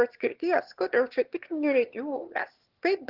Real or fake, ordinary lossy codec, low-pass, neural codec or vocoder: fake; Opus, 16 kbps; 5.4 kHz; autoencoder, 22.05 kHz, a latent of 192 numbers a frame, VITS, trained on one speaker